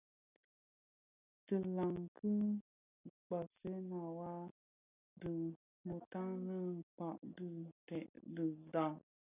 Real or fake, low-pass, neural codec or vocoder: real; 3.6 kHz; none